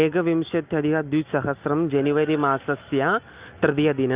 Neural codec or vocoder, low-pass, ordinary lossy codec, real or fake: none; 3.6 kHz; Opus, 24 kbps; real